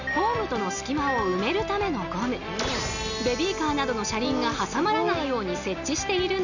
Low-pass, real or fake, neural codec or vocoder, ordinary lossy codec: 7.2 kHz; real; none; none